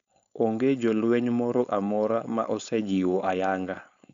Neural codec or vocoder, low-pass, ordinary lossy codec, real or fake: codec, 16 kHz, 4.8 kbps, FACodec; 7.2 kHz; none; fake